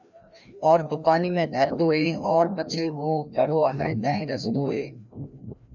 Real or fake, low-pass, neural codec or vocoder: fake; 7.2 kHz; codec, 16 kHz, 1 kbps, FreqCodec, larger model